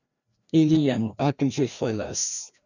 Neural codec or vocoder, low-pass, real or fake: codec, 16 kHz, 1 kbps, FreqCodec, larger model; 7.2 kHz; fake